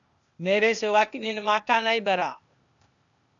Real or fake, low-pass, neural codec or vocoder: fake; 7.2 kHz; codec, 16 kHz, 0.8 kbps, ZipCodec